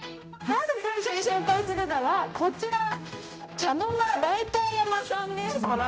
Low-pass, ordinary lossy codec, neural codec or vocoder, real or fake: none; none; codec, 16 kHz, 0.5 kbps, X-Codec, HuBERT features, trained on general audio; fake